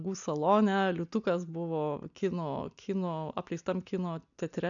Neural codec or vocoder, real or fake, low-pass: none; real; 7.2 kHz